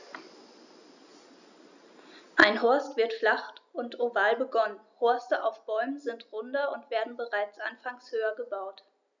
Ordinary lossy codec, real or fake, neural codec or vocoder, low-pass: none; real; none; 7.2 kHz